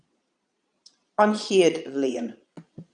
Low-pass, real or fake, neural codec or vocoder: 9.9 kHz; fake; vocoder, 22.05 kHz, 80 mel bands, Vocos